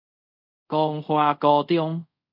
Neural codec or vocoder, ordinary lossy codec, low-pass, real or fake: codec, 24 kHz, 0.5 kbps, DualCodec; AAC, 48 kbps; 5.4 kHz; fake